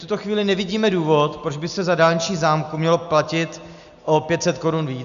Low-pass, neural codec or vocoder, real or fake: 7.2 kHz; none; real